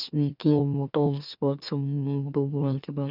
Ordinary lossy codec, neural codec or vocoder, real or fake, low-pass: none; autoencoder, 44.1 kHz, a latent of 192 numbers a frame, MeloTTS; fake; 5.4 kHz